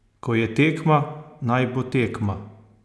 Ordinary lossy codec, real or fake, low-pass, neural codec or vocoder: none; real; none; none